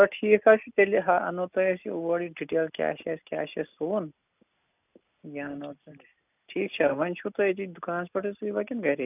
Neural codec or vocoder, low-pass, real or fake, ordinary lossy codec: none; 3.6 kHz; real; none